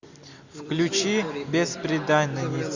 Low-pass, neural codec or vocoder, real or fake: 7.2 kHz; none; real